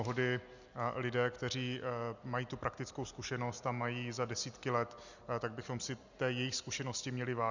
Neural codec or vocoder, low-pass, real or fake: none; 7.2 kHz; real